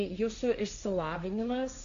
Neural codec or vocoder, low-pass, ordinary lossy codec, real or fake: codec, 16 kHz, 1.1 kbps, Voila-Tokenizer; 7.2 kHz; MP3, 64 kbps; fake